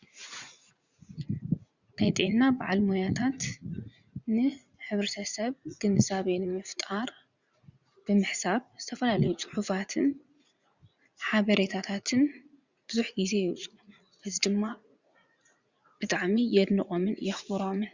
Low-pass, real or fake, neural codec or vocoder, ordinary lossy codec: 7.2 kHz; fake; vocoder, 44.1 kHz, 80 mel bands, Vocos; Opus, 64 kbps